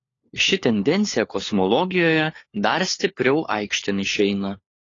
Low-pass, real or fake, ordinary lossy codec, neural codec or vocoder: 7.2 kHz; fake; AAC, 32 kbps; codec, 16 kHz, 4 kbps, FunCodec, trained on LibriTTS, 50 frames a second